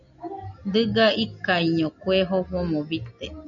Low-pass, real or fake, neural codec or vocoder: 7.2 kHz; real; none